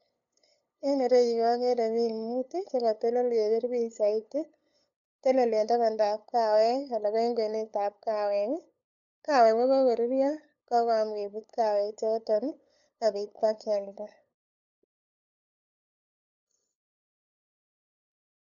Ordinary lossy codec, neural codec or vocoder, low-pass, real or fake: none; codec, 16 kHz, 8 kbps, FunCodec, trained on LibriTTS, 25 frames a second; 7.2 kHz; fake